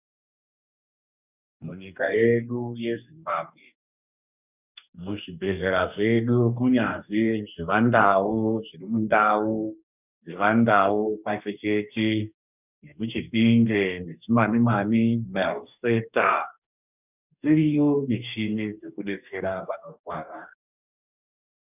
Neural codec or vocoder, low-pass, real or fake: codec, 44.1 kHz, 2.6 kbps, DAC; 3.6 kHz; fake